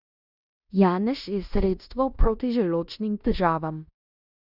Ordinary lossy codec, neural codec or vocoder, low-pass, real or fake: none; codec, 16 kHz in and 24 kHz out, 0.9 kbps, LongCat-Audio-Codec, fine tuned four codebook decoder; 5.4 kHz; fake